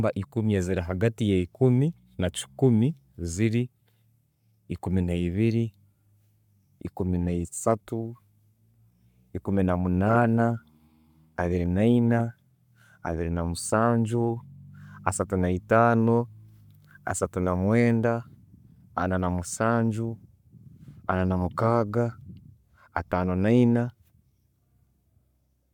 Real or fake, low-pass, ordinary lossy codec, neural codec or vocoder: fake; 19.8 kHz; none; codec, 44.1 kHz, 7.8 kbps, Pupu-Codec